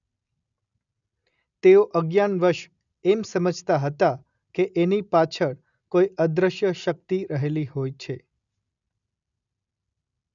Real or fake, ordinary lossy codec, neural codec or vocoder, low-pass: real; none; none; 7.2 kHz